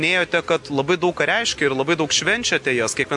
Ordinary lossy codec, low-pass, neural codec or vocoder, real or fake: MP3, 96 kbps; 10.8 kHz; none; real